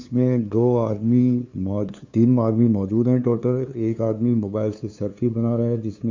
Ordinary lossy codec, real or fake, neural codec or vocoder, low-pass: MP3, 48 kbps; fake; codec, 16 kHz, 2 kbps, FunCodec, trained on LibriTTS, 25 frames a second; 7.2 kHz